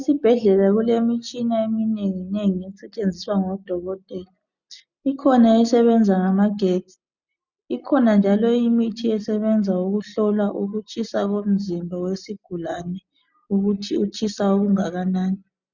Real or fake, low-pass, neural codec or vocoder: real; 7.2 kHz; none